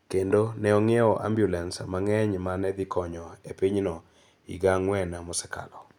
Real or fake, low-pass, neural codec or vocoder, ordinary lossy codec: real; 19.8 kHz; none; none